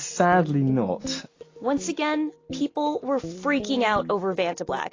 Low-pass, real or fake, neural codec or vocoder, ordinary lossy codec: 7.2 kHz; real; none; AAC, 32 kbps